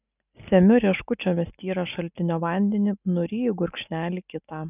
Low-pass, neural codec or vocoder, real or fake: 3.6 kHz; none; real